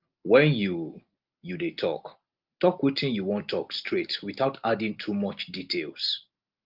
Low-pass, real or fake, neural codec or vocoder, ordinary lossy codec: 5.4 kHz; real; none; Opus, 32 kbps